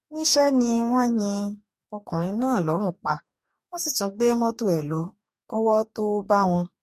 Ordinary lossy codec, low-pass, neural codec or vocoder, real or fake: MP3, 64 kbps; 14.4 kHz; codec, 44.1 kHz, 2.6 kbps, DAC; fake